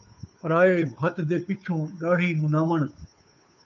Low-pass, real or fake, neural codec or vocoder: 7.2 kHz; fake; codec, 16 kHz, 2 kbps, FunCodec, trained on Chinese and English, 25 frames a second